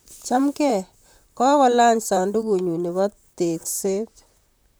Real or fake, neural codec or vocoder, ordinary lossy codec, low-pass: fake; vocoder, 44.1 kHz, 128 mel bands, Pupu-Vocoder; none; none